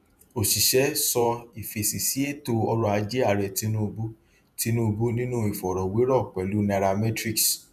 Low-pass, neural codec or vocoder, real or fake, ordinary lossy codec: 14.4 kHz; vocoder, 48 kHz, 128 mel bands, Vocos; fake; none